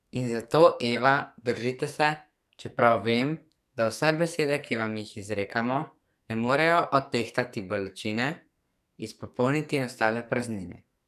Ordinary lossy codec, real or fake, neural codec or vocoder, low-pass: none; fake; codec, 44.1 kHz, 2.6 kbps, SNAC; 14.4 kHz